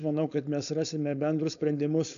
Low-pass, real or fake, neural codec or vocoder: 7.2 kHz; fake; codec, 16 kHz, 4.8 kbps, FACodec